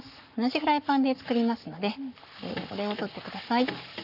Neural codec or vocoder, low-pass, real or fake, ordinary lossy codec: codec, 44.1 kHz, 7.8 kbps, Pupu-Codec; 5.4 kHz; fake; none